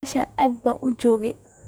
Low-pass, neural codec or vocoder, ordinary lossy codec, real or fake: none; codec, 44.1 kHz, 2.6 kbps, DAC; none; fake